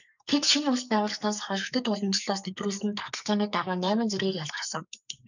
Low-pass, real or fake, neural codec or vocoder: 7.2 kHz; fake; codec, 44.1 kHz, 2.6 kbps, SNAC